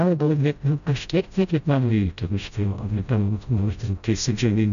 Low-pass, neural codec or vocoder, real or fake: 7.2 kHz; codec, 16 kHz, 0.5 kbps, FreqCodec, smaller model; fake